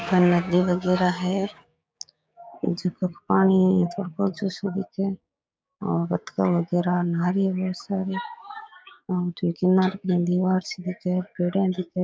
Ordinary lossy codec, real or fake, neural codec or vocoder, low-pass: none; fake; codec, 16 kHz, 6 kbps, DAC; none